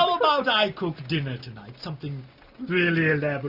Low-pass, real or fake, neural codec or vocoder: 5.4 kHz; real; none